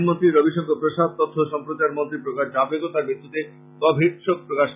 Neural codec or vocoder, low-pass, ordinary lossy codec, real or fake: none; 3.6 kHz; none; real